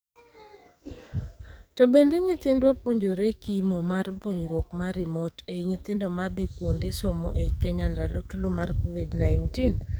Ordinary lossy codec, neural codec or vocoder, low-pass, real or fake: none; codec, 44.1 kHz, 2.6 kbps, SNAC; none; fake